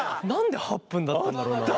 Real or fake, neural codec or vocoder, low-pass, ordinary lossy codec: real; none; none; none